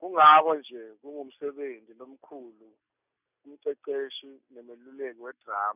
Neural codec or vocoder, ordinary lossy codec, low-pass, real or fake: none; none; 3.6 kHz; real